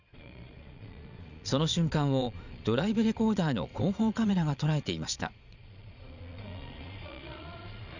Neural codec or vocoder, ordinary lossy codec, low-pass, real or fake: vocoder, 22.05 kHz, 80 mel bands, Vocos; none; 7.2 kHz; fake